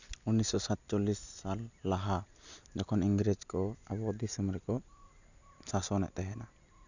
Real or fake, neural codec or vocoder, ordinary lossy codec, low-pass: real; none; none; 7.2 kHz